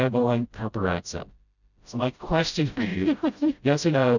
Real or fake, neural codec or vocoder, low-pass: fake; codec, 16 kHz, 0.5 kbps, FreqCodec, smaller model; 7.2 kHz